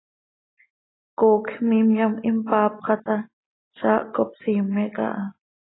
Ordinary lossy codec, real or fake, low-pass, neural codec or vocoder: AAC, 16 kbps; real; 7.2 kHz; none